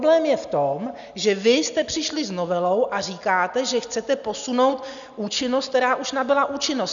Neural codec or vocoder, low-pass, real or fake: none; 7.2 kHz; real